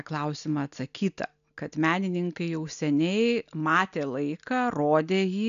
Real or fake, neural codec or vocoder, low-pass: real; none; 7.2 kHz